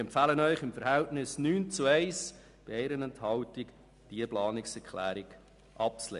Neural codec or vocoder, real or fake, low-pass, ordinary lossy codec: none; real; 10.8 kHz; MP3, 96 kbps